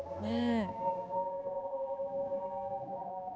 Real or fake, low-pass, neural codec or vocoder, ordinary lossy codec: fake; none; codec, 16 kHz, 2 kbps, X-Codec, HuBERT features, trained on balanced general audio; none